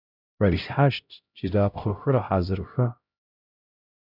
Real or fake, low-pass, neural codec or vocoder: fake; 5.4 kHz; codec, 16 kHz, 0.5 kbps, X-Codec, HuBERT features, trained on LibriSpeech